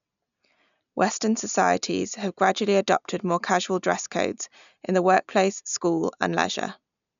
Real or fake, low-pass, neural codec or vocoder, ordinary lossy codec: real; 7.2 kHz; none; none